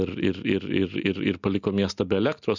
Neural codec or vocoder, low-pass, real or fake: none; 7.2 kHz; real